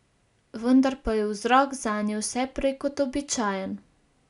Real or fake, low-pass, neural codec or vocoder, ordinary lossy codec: real; 10.8 kHz; none; none